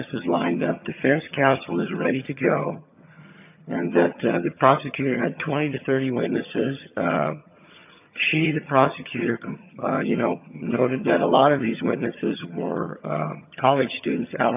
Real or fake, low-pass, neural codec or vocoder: fake; 3.6 kHz; vocoder, 22.05 kHz, 80 mel bands, HiFi-GAN